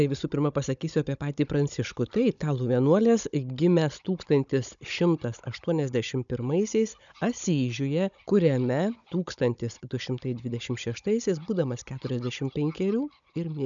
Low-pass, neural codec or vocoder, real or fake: 7.2 kHz; codec, 16 kHz, 16 kbps, FunCodec, trained on Chinese and English, 50 frames a second; fake